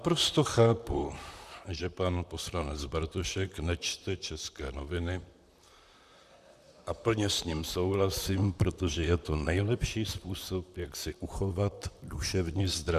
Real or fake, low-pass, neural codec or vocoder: fake; 14.4 kHz; vocoder, 44.1 kHz, 128 mel bands, Pupu-Vocoder